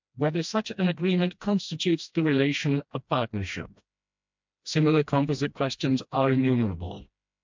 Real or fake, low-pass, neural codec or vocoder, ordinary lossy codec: fake; 7.2 kHz; codec, 16 kHz, 1 kbps, FreqCodec, smaller model; MP3, 64 kbps